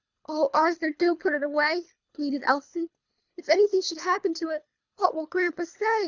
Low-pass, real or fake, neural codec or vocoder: 7.2 kHz; fake; codec, 24 kHz, 3 kbps, HILCodec